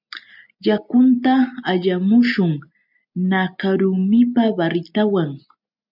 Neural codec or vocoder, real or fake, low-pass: none; real; 5.4 kHz